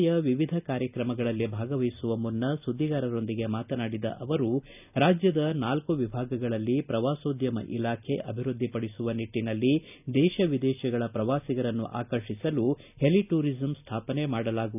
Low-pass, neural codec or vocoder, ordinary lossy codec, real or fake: 3.6 kHz; none; none; real